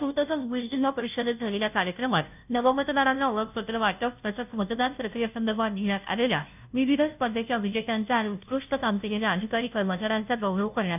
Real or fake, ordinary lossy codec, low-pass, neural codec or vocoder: fake; none; 3.6 kHz; codec, 16 kHz, 0.5 kbps, FunCodec, trained on Chinese and English, 25 frames a second